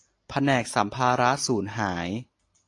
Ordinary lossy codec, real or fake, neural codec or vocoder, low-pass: AAC, 48 kbps; real; none; 10.8 kHz